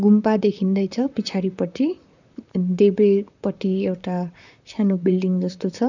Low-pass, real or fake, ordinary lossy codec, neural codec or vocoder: 7.2 kHz; fake; none; vocoder, 44.1 kHz, 128 mel bands, Pupu-Vocoder